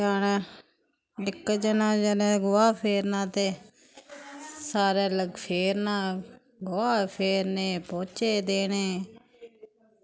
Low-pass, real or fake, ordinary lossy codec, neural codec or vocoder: none; real; none; none